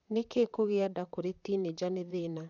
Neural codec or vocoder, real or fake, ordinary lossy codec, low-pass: vocoder, 44.1 kHz, 128 mel bands, Pupu-Vocoder; fake; none; 7.2 kHz